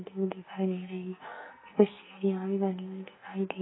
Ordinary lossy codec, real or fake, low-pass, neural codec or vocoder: AAC, 16 kbps; fake; 7.2 kHz; codec, 44.1 kHz, 2.6 kbps, SNAC